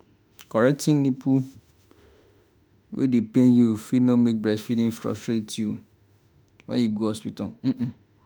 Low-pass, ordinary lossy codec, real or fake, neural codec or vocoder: none; none; fake; autoencoder, 48 kHz, 32 numbers a frame, DAC-VAE, trained on Japanese speech